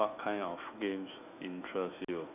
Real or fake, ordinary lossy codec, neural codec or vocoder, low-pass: fake; none; autoencoder, 48 kHz, 128 numbers a frame, DAC-VAE, trained on Japanese speech; 3.6 kHz